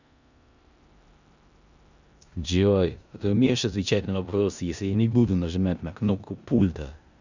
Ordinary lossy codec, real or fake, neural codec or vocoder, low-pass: none; fake; codec, 16 kHz in and 24 kHz out, 0.9 kbps, LongCat-Audio-Codec, four codebook decoder; 7.2 kHz